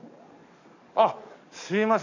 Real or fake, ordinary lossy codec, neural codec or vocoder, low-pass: fake; AAC, 48 kbps; codec, 44.1 kHz, 7.8 kbps, DAC; 7.2 kHz